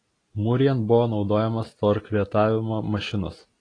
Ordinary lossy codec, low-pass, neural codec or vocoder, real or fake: AAC, 32 kbps; 9.9 kHz; none; real